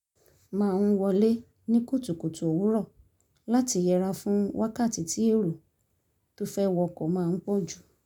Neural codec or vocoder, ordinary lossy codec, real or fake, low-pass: none; none; real; 19.8 kHz